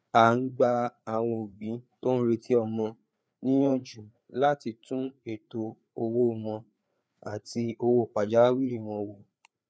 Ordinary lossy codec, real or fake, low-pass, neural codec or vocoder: none; fake; none; codec, 16 kHz, 4 kbps, FreqCodec, larger model